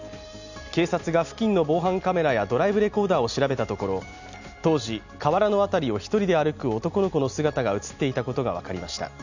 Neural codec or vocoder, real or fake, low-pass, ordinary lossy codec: none; real; 7.2 kHz; none